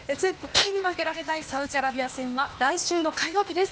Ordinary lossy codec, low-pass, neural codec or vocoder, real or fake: none; none; codec, 16 kHz, 0.8 kbps, ZipCodec; fake